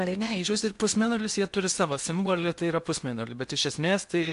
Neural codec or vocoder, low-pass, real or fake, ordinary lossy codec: codec, 16 kHz in and 24 kHz out, 0.8 kbps, FocalCodec, streaming, 65536 codes; 10.8 kHz; fake; MP3, 64 kbps